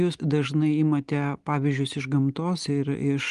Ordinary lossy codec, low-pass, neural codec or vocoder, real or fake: Opus, 24 kbps; 10.8 kHz; none; real